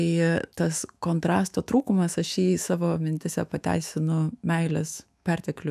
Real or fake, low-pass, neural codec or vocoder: fake; 14.4 kHz; autoencoder, 48 kHz, 128 numbers a frame, DAC-VAE, trained on Japanese speech